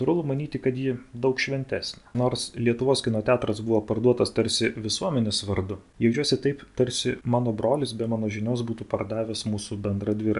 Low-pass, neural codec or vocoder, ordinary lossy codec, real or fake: 10.8 kHz; none; MP3, 96 kbps; real